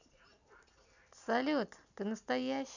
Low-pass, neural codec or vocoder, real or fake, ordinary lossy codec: 7.2 kHz; none; real; none